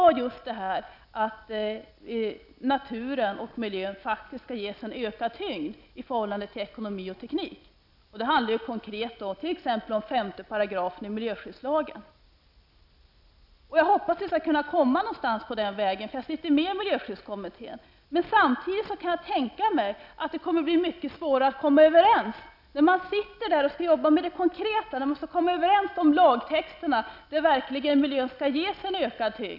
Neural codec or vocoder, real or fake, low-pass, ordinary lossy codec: none; real; 5.4 kHz; none